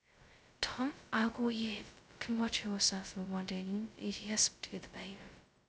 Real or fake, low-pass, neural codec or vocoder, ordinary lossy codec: fake; none; codec, 16 kHz, 0.2 kbps, FocalCodec; none